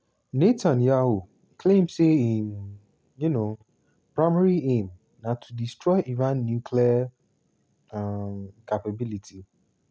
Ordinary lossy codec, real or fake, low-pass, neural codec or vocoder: none; real; none; none